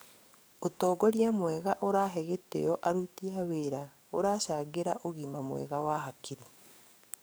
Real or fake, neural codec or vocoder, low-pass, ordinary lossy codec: fake; codec, 44.1 kHz, 7.8 kbps, DAC; none; none